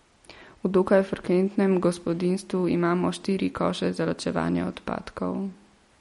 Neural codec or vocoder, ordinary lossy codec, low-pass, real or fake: none; MP3, 48 kbps; 14.4 kHz; real